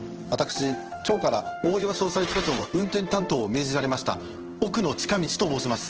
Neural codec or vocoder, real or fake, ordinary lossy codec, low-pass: codec, 16 kHz in and 24 kHz out, 1 kbps, XY-Tokenizer; fake; Opus, 16 kbps; 7.2 kHz